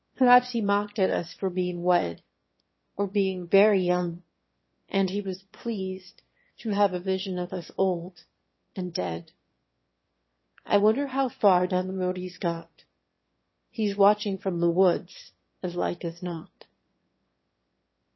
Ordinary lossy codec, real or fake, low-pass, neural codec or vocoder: MP3, 24 kbps; fake; 7.2 kHz; autoencoder, 22.05 kHz, a latent of 192 numbers a frame, VITS, trained on one speaker